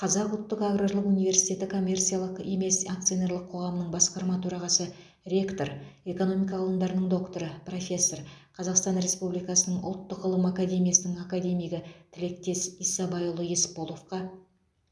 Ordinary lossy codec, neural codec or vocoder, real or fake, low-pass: none; none; real; none